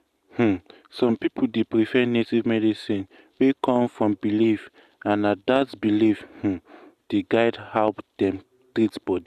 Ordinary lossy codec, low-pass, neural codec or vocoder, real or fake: Opus, 64 kbps; 14.4 kHz; none; real